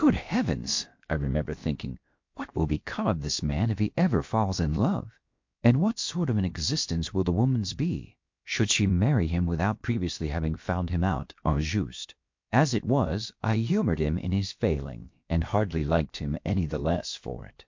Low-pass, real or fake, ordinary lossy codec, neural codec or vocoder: 7.2 kHz; fake; MP3, 48 kbps; codec, 16 kHz, about 1 kbps, DyCAST, with the encoder's durations